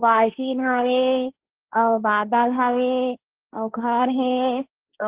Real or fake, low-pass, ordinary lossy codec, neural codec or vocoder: fake; 3.6 kHz; Opus, 16 kbps; codec, 16 kHz, 1.1 kbps, Voila-Tokenizer